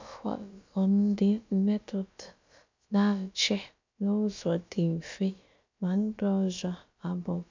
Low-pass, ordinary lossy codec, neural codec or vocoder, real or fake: 7.2 kHz; MP3, 64 kbps; codec, 16 kHz, about 1 kbps, DyCAST, with the encoder's durations; fake